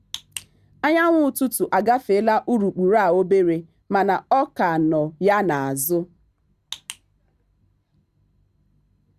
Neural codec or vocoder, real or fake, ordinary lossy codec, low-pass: none; real; Opus, 64 kbps; 14.4 kHz